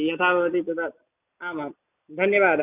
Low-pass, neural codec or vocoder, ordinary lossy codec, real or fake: 3.6 kHz; none; none; real